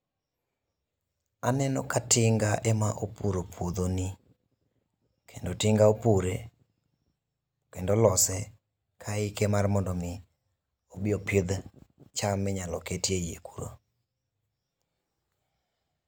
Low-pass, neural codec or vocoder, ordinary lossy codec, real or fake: none; none; none; real